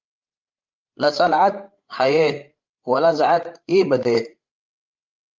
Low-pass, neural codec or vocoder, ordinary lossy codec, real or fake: 7.2 kHz; codec, 16 kHz, 16 kbps, FreqCodec, larger model; Opus, 24 kbps; fake